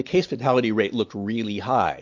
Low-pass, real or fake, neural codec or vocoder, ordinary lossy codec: 7.2 kHz; real; none; MP3, 48 kbps